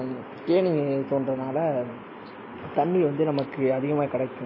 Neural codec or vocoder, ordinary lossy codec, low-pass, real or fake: none; MP3, 24 kbps; 5.4 kHz; real